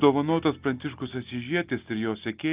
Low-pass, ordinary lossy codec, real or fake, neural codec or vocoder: 3.6 kHz; Opus, 32 kbps; real; none